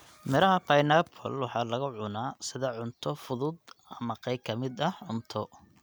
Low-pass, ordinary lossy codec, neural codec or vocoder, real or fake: none; none; none; real